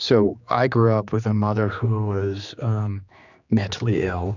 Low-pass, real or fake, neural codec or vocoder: 7.2 kHz; fake; codec, 16 kHz, 2 kbps, X-Codec, HuBERT features, trained on general audio